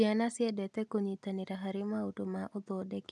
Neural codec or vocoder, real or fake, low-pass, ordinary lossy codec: none; real; none; none